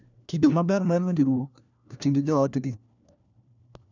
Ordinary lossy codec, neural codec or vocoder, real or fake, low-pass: none; codec, 16 kHz, 1 kbps, FunCodec, trained on LibriTTS, 50 frames a second; fake; 7.2 kHz